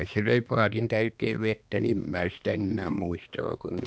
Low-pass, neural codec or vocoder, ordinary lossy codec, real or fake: none; codec, 16 kHz, 2 kbps, X-Codec, HuBERT features, trained on balanced general audio; none; fake